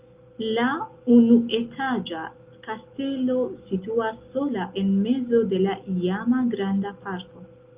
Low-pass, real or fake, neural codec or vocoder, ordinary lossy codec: 3.6 kHz; real; none; Opus, 32 kbps